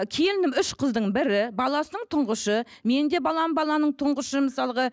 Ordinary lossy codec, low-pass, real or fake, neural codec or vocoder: none; none; real; none